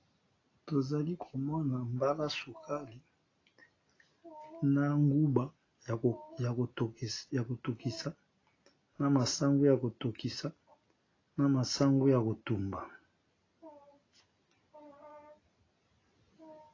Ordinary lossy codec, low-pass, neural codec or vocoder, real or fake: AAC, 32 kbps; 7.2 kHz; none; real